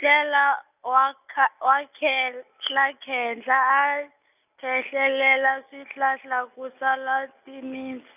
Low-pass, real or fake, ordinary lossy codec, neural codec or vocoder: 3.6 kHz; real; none; none